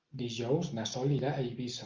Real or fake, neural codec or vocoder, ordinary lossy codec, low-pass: real; none; Opus, 24 kbps; 7.2 kHz